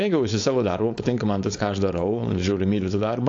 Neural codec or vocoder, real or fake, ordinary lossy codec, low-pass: codec, 16 kHz, 4.8 kbps, FACodec; fake; AAC, 48 kbps; 7.2 kHz